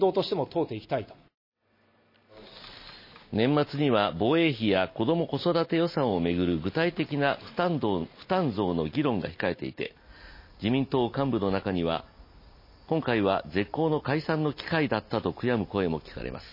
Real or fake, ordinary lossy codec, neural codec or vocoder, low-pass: real; MP3, 24 kbps; none; 5.4 kHz